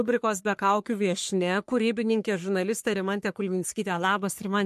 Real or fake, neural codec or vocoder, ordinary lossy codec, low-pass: fake; codec, 44.1 kHz, 3.4 kbps, Pupu-Codec; MP3, 64 kbps; 14.4 kHz